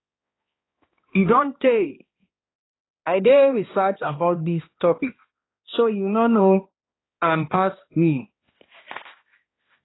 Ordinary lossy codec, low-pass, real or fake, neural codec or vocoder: AAC, 16 kbps; 7.2 kHz; fake; codec, 16 kHz, 1 kbps, X-Codec, HuBERT features, trained on balanced general audio